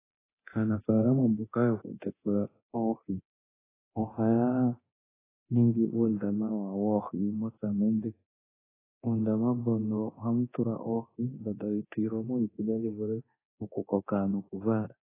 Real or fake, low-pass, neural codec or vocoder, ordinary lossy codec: fake; 3.6 kHz; codec, 24 kHz, 0.9 kbps, DualCodec; AAC, 16 kbps